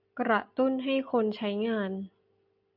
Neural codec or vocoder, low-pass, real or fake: none; 5.4 kHz; real